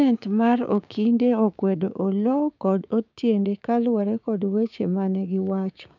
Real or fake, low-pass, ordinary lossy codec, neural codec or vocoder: fake; 7.2 kHz; none; codec, 16 kHz, 6 kbps, DAC